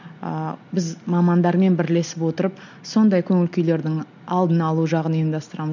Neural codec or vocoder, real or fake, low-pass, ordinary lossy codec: none; real; 7.2 kHz; none